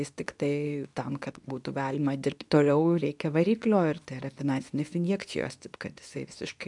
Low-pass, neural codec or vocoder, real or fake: 10.8 kHz; codec, 24 kHz, 0.9 kbps, WavTokenizer, medium speech release version 2; fake